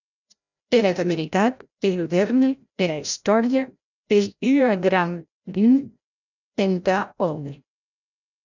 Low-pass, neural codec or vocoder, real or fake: 7.2 kHz; codec, 16 kHz, 0.5 kbps, FreqCodec, larger model; fake